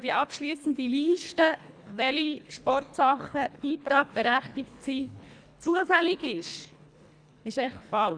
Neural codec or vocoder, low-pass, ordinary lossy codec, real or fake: codec, 24 kHz, 1.5 kbps, HILCodec; 9.9 kHz; MP3, 96 kbps; fake